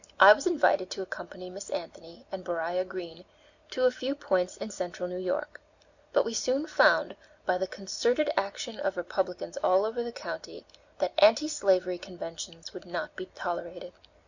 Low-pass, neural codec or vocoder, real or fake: 7.2 kHz; none; real